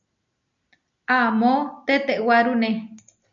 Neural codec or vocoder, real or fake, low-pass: none; real; 7.2 kHz